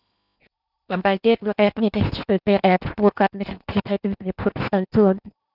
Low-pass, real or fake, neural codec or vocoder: 5.4 kHz; fake; codec, 16 kHz in and 24 kHz out, 0.8 kbps, FocalCodec, streaming, 65536 codes